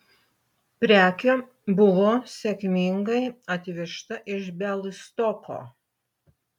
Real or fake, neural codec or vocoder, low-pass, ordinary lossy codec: real; none; 19.8 kHz; MP3, 96 kbps